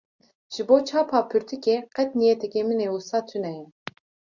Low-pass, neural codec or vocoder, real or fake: 7.2 kHz; none; real